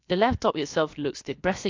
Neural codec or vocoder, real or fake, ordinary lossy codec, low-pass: codec, 16 kHz, about 1 kbps, DyCAST, with the encoder's durations; fake; AAC, 48 kbps; 7.2 kHz